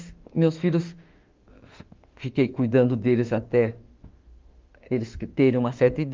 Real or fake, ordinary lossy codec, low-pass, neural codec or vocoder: fake; Opus, 32 kbps; 7.2 kHz; autoencoder, 48 kHz, 32 numbers a frame, DAC-VAE, trained on Japanese speech